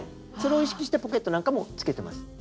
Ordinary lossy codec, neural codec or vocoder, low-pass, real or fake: none; none; none; real